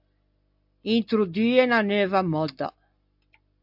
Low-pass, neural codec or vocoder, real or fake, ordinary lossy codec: 5.4 kHz; none; real; AAC, 48 kbps